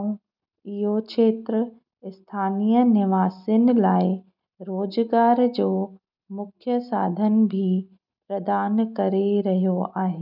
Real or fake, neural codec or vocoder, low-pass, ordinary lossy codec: real; none; 5.4 kHz; none